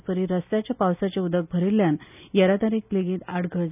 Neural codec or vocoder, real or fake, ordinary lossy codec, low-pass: none; real; none; 3.6 kHz